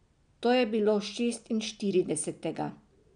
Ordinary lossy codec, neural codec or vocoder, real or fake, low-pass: none; none; real; 9.9 kHz